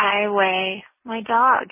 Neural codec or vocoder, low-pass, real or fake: none; 3.6 kHz; real